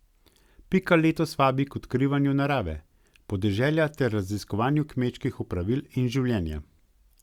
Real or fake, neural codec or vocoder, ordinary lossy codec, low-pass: real; none; Opus, 64 kbps; 19.8 kHz